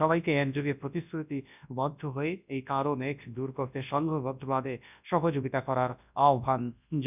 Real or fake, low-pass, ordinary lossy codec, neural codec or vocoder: fake; 3.6 kHz; none; codec, 24 kHz, 0.9 kbps, WavTokenizer, large speech release